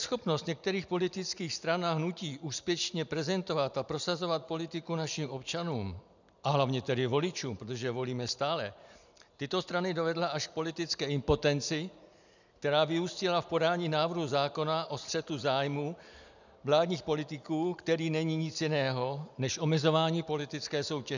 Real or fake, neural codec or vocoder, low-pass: real; none; 7.2 kHz